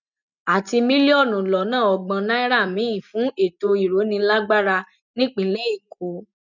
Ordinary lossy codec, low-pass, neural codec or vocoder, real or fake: none; 7.2 kHz; none; real